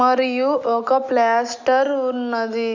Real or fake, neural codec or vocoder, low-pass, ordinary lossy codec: real; none; 7.2 kHz; none